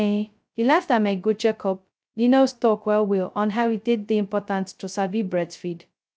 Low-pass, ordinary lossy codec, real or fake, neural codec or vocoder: none; none; fake; codec, 16 kHz, 0.2 kbps, FocalCodec